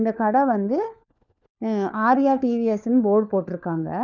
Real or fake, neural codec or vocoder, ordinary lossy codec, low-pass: fake; codec, 16 kHz, 4 kbps, FunCodec, trained on LibriTTS, 50 frames a second; none; 7.2 kHz